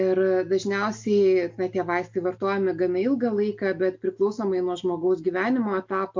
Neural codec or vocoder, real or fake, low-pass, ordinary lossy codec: none; real; 7.2 kHz; MP3, 48 kbps